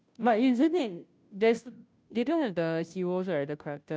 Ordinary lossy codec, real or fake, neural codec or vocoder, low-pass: none; fake; codec, 16 kHz, 0.5 kbps, FunCodec, trained on Chinese and English, 25 frames a second; none